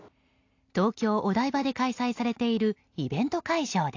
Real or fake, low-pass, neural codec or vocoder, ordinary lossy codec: real; 7.2 kHz; none; AAC, 48 kbps